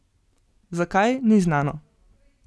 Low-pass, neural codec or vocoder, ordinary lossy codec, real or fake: none; none; none; real